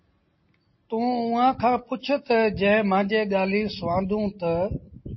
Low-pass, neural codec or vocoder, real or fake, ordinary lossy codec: 7.2 kHz; none; real; MP3, 24 kbps